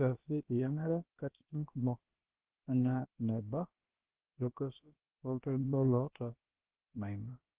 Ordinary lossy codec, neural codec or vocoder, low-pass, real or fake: Opus, 16 kbps; codec, 16 kHz, about 1 kbps, DyCAST, with the encoder's durations; 3.6 kHz; fake